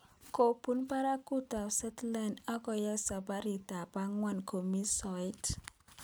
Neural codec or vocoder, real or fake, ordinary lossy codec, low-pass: none; real; none; none